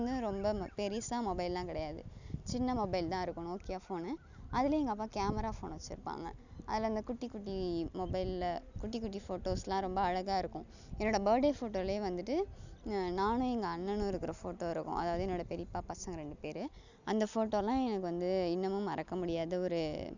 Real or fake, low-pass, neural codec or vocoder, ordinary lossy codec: real; 7.2 kHz; none; none